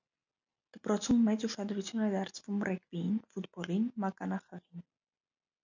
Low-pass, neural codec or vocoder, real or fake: 7.2 kHz; none; real